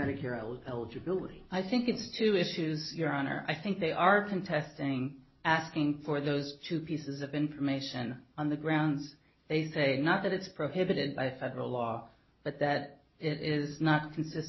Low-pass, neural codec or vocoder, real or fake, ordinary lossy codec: 7.2 kHz; none; real; MP3, 24 kbps